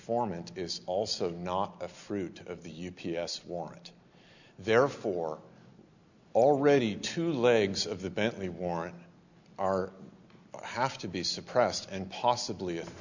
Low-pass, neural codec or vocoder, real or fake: 7.2 kHz; none; real